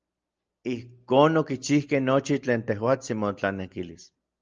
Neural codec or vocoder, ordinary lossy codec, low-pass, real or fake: none; Opus, 24 kbps; 7.2 kHz; real